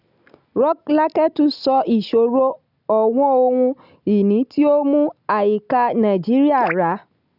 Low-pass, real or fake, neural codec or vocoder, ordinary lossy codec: 5.4 kHz; real; none; none